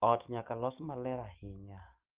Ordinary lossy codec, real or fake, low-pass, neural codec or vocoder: Opus, 24 kbps; fake; 3.6 kHz; codec, 44.1 kHz, 7.8 kbps, DAC